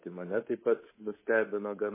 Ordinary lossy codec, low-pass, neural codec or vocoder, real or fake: MP3, 16 kbps; 3.6 kHz; codec, 24 kHz, 3.1 kbps, DualCodec; fake